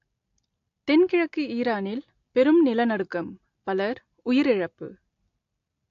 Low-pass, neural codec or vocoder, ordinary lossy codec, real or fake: 7.2 kHz; none; AAC, 48 kbps; real